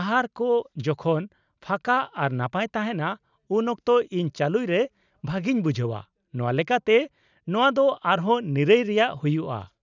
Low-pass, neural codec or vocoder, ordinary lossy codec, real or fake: 7.2 kHz; none; none; real